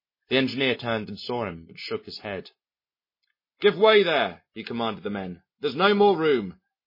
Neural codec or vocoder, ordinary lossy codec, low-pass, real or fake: none; MP3, 24 kbps; 5.4 kHz; real